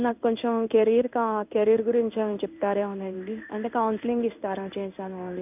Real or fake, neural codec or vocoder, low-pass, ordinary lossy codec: fake; codec, 16 kHz in and 24 kHz out, 1 kbps, XY-Tokenizer; 3.6 kHz; none